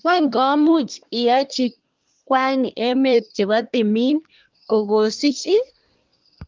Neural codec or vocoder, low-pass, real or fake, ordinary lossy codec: codec, 24 kHz, 1 kbps, SNAC; 7.2 kHz; fake; Opus, 32 kbps